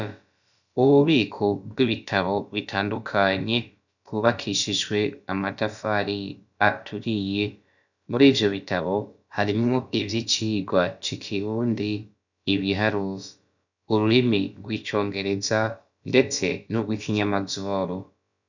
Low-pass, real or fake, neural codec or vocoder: 7.2 kHz; fake; codec, 16 kHz, about 1 kbps, DyCAST, with the encoder's durations